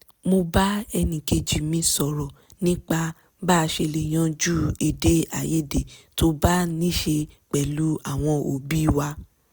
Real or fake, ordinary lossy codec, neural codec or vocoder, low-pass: real; none; none; none